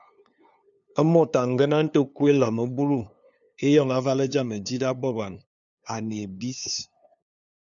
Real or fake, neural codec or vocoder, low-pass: fake; codec, 16 kHz, 2 kbps, FunCodec, trained on LibriTTS, 25 frames a second; 7.2 kHz